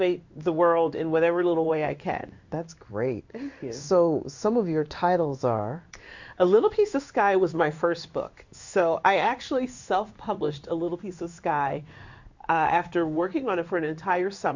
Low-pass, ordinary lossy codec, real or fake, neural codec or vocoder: 7.2 kHz; Opus, 64 kbps; fake; codec, 16 kHz in and 24 kHz out, 1 kbps, XY-Tokenizer